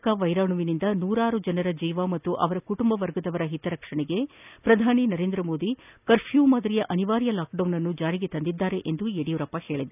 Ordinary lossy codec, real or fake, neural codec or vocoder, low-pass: none; real; none; 3.6 kHz